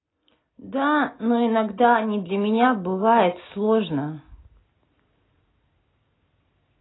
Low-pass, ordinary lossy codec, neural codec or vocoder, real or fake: 7.2 kHz; AAC, 16 kbps; none; real